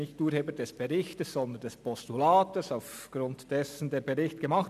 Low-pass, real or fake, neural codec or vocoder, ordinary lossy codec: 14.4 kHz; real; none; none